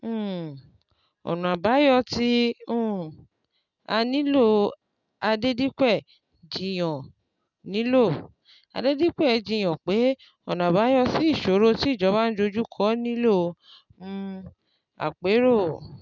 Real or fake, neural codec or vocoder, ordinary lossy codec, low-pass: real; none; none; 7.2 kHz